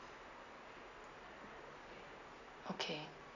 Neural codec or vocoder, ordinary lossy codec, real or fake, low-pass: none; none; real; 7.2 kHz